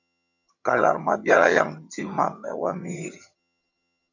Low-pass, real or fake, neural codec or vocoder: 7.2 kHz; fake; vocoder, 22.05 kHz, 80 mel bands, HiFi-GAN